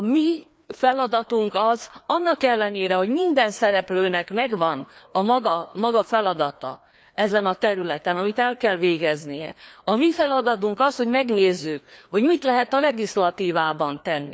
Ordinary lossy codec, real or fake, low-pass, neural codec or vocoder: none; fake; none; codec, 16 kHz, 2 kbps, FreqCodec, larger model